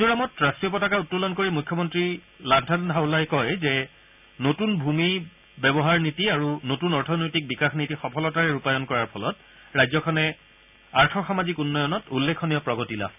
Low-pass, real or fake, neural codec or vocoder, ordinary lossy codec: 3.6 kHz; real; none; none